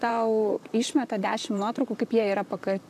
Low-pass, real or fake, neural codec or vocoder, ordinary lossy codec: 14.4 kHz; fake; vocoder, 44.1 kHz, 128 mel bands, Pupu-Vocoder; AAC, 96 kbps